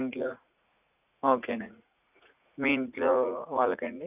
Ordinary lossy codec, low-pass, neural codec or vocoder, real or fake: none; 3.6 kHz; vocoder, 44.1 kHz, 80 mel bands, Vocos; fake